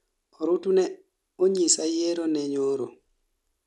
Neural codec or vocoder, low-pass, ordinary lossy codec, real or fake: none; none; none; real